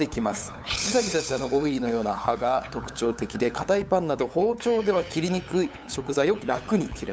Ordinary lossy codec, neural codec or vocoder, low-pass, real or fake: none; codec, 16 kHz, 8 kbps, FunCodec, trained on LibriTTS, 25 frames a second; none; fake